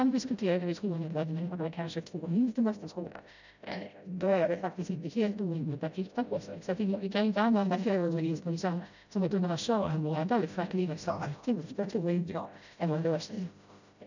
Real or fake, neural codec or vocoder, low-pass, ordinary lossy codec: fake; codec, 16 kHz, 0.5 kbps, FreqCodec, smaller model; 7.2 kHz; none